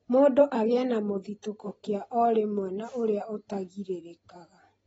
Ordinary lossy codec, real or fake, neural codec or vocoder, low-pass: AAC, 24 kbps; real; none; 19.8 kHz